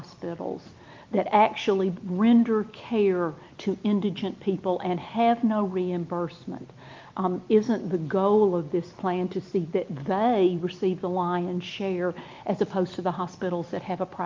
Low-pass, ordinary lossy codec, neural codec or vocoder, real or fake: 7.2 kHz; Opus, 24 kbps; none; real